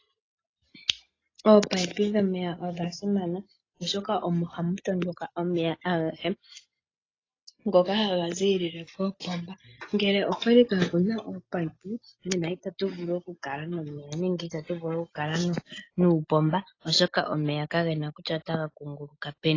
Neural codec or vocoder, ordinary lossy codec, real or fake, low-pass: none; AAC, 32 kbps; real; 7.2 kHz